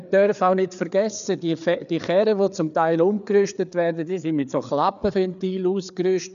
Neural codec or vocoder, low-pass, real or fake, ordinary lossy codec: codec, 16 kHz, 4 kbps, FreqCodec, larger model; 7.2 kHz; fake; none